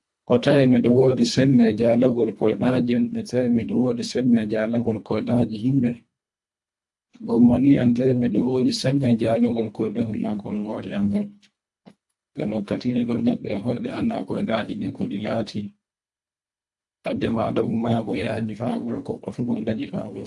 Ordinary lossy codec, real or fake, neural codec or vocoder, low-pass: AAC, 64 kbps; fake; codec, 24 kHz, 1.5 kbps, HILCodec; 10.8 kHz